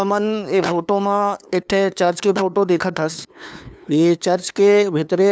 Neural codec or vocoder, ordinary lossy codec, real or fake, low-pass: codec, 16 kHz, 2 kbps, FunCodec, trained on LibriTTS, 25 frames a second; none; fake; none